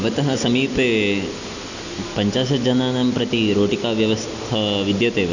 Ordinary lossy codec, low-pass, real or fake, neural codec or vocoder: none; 7.2 kHz; real; none